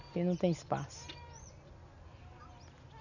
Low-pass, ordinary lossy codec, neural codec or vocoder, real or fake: 7.2 kHz; none; none; real